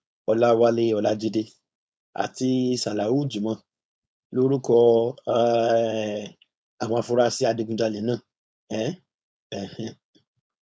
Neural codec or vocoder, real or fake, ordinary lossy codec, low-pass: codec, 16 kHz, 4.8 kbps, FACodec; fake; none; none